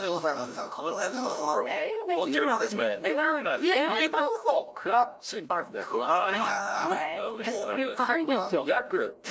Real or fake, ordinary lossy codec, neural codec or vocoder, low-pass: fake; none; codec, 16 kHz, 0.5 kbps, FreqCodec, larger model; none